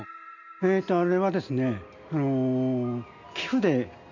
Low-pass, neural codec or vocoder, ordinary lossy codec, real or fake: 7.2 kHz; none; none; real